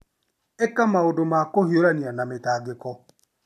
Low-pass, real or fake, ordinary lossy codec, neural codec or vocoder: 14.4 kHz; real; MP3, 96 kbps; none